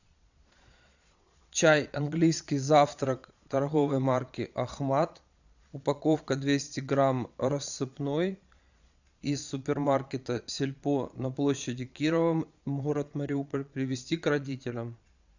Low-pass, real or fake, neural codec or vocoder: 7.2 kHz; fake; vocoder, 22.05 kHz, 80 mel bands, Vocos